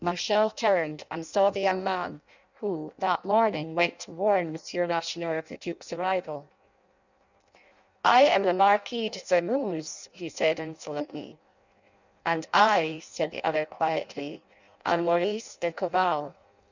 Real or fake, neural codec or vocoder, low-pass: fake; codec, 16 kHz in and 24 kHz out, 0.6 kbps, FireRedTTS-2 codec; 7.2 kHz